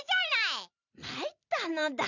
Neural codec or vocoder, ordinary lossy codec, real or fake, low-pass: vocoder, 44.1 kHz, 128 mel bands every 256 samples, BigVGAN v2; none; fake; 7.2 kHz